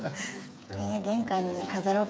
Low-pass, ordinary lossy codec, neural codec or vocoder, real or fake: none; none; codec, 16 kHz, 4 kbps, FreqCodec, smaller model; fake